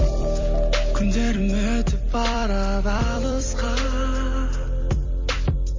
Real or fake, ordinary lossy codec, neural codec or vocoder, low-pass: fake; MP3, 32 kbps; vocoder, 44.1 kHz, 128 mel bands every 256 samples, BigVGAN v2; 7.2 kHz